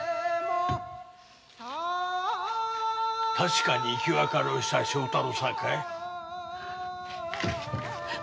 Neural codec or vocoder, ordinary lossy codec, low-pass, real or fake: none; none; none; real